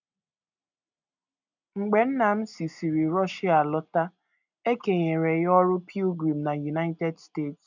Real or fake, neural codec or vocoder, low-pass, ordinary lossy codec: real; none; 7.2 kHz; none